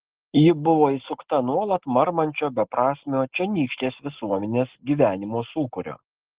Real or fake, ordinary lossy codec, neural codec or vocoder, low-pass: real; Opus, 16 kbps; none; 3.6 kHz